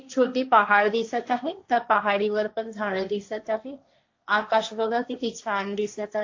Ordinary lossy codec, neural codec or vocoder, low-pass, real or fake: AAC, 48 kbps; codec, 16 kHz, 1.1 kbps, Voila-Tokenizer; 7.2 kHz; fake